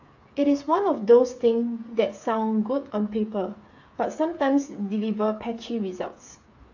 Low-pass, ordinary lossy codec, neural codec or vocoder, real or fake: 7.2 kHz; AAC, 48 kbps; codec, 16 kHz, 8 kbps, FreqCodec, smaller model; fake